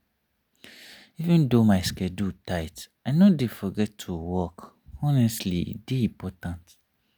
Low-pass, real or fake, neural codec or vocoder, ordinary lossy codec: none; real; none; none